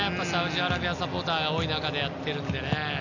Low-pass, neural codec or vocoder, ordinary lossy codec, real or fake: 7.2 kHz; none; none; real